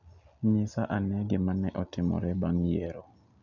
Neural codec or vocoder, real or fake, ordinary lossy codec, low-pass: none; real; none; 7.2 kHz